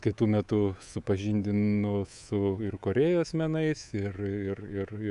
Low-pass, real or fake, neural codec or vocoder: 10.8 kHz; fake; codec, 24 kHz, 3.1 kbps, DualCodec